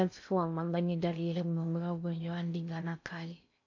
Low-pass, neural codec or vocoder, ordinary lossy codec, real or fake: 7.2 kHz; codec, 16 kHz in and 24 kHz out, 0.6 kbps, FocalCodec, streaming, 4096 codes; AAC, 48 kbps; fake